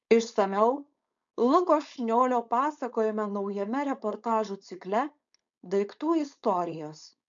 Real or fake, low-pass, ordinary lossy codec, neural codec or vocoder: fake; 7.2 kHz; MP3, 64 kbps; codec, 16 kHz, 4.8 kbps, FACodec